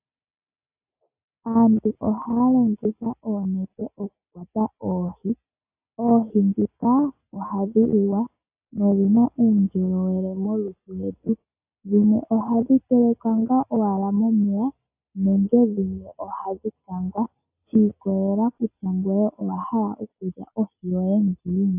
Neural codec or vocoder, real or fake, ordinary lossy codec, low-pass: none; real; AAC, 32 kbps; 3.6 kHz